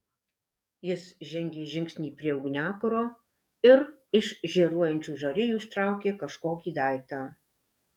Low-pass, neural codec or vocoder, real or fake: 19.8 kHz; codec, 44.1 kHz, 7.8 kbps, DAC; fake